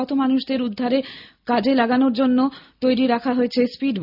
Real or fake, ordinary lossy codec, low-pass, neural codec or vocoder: real; none; 5.4 kHz; none